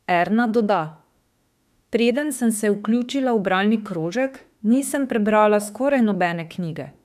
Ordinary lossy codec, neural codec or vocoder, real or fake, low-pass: none; autoencoder, 48 kHz, 32 numbers a frame, DAC-VAE, trained on Japanese speech; fake; 14.4 kHz